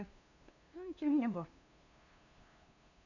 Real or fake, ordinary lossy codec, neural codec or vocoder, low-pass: fake; none; codec, 16 kHz, 2 kbps, FunCodec, trained on LibriTTS, 25 frames a second; 7.2 kHz